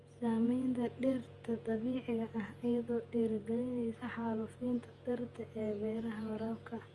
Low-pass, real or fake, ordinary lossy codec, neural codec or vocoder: 10.8 kHz; fake; Opus, 32 kbps; vocoder, 48 kHz, 128 mel bands, Vocos